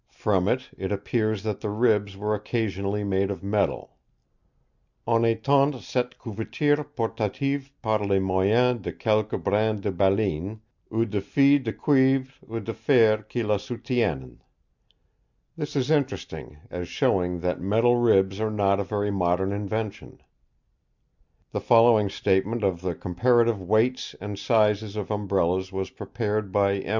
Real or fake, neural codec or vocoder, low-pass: real; none; 7.2 kHz